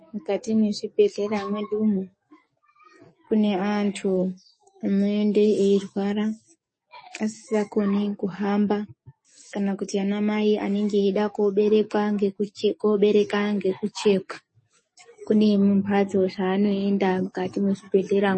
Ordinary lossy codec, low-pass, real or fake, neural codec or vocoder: MP3, 32 kbps; 9.9 kHz; fake; codec, 44.1 kHz, 7.8 kbps, DAC